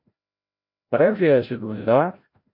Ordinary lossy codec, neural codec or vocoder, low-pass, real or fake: MP3, 32 kbps; codec, 16 kHz, 0.5 kbps, FreqCodec, larger model; 5.4 kHz; fake